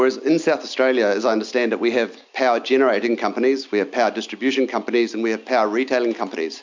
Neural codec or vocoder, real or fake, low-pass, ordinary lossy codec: none; real; 7.2 kHz; MP3, 64 kbps